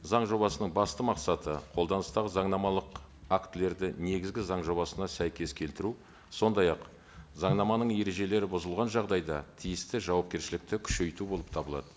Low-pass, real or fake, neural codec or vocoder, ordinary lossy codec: none; real; none; none